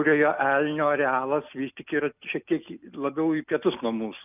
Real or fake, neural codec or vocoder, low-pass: real; none; 3.6 kHz